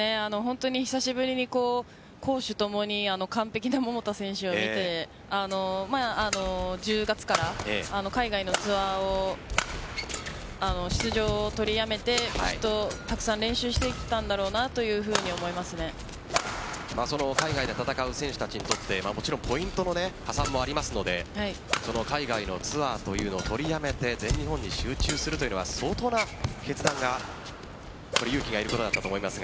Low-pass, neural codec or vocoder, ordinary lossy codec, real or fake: none; none; none; real